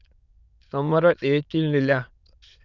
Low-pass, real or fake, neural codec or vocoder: 7.2 kHz; fake; autoencoder, 22.05 kHz, a latent of 192 numbers a frame, VITS, trained on many speakers